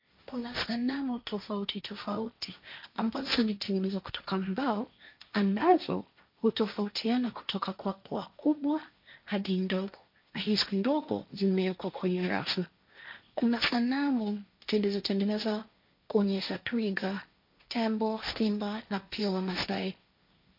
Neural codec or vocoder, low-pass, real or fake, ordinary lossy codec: codec, 16 kHz, 1.1 kbps, Voila-Tokenizer; 5.4 kHz; fake; MP3, 48 kbps